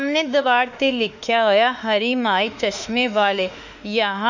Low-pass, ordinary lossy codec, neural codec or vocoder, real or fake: 7.2 kHz; none; autoencoder, 48 kHz, 32 numbers a frame, DAC-VAE, trained on Japanese speech; fake